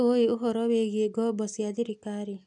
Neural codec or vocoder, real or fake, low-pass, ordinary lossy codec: none; real; 10.8 kHz; none